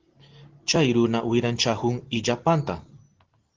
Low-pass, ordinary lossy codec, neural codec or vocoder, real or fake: 7.2 kHz; Opus, 16 kbps; none; real